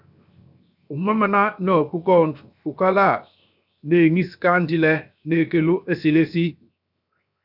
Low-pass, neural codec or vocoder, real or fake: 5.4 kHz; codec, 16 kHz, 0.7 kbps, FocalCodec; fake